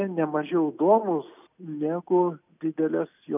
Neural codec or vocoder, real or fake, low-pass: none; real; 3.6 kHz